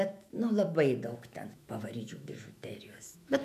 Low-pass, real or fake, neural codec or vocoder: 14.4 kHz; fake; autoencoder, 48 kHz, 128 numbers a frame, DAC-VAE, trained on Japanese speech